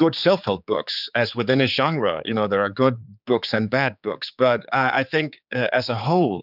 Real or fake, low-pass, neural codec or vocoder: fake; 5.4 kHz; codec, 16 kHz, 4 kbps, X-Codec, HuBERT features, trained on general audio